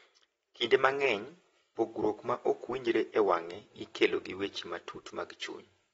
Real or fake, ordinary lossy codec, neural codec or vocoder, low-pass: real; AAC, 24 kbps; none; 19.8 kHz